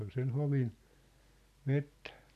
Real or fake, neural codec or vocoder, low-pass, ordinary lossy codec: real; none; 14.4 kHz; none